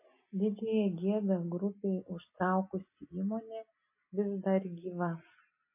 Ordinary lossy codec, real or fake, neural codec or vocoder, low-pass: MP3, 24 kbps; real; none; 3.6 kHz